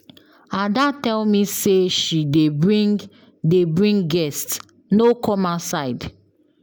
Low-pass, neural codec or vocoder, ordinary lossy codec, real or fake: none; none; none; real